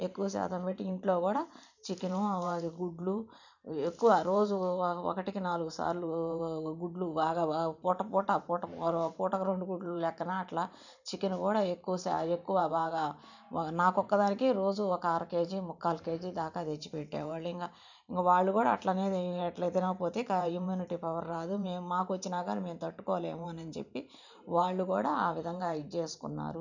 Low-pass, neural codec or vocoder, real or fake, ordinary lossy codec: 7.2 kHz; vocoder, 44.1 kHz, 128 mel bands every 512 samples, BigVGAN v2; fake; none